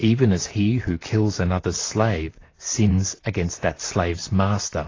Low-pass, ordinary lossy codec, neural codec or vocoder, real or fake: 7.2 kHz; AAC, 32 kbps; vocoder, 44.1 kHz, 128 mel bands, Pupu-Vocoder; fake